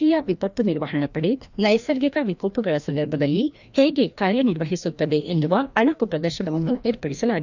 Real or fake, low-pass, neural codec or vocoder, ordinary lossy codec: fake; 7.2 kHz; codec, 16 kHz, 1 kbps, FreqCodec, larger model; none